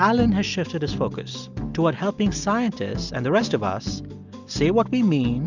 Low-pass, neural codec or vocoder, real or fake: 7.2 kHz; none; real